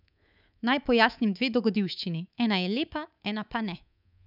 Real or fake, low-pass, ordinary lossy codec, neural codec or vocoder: fake; 5.4 kHz; none; codec, 24 kHz, 3.1 kbps, DualCodec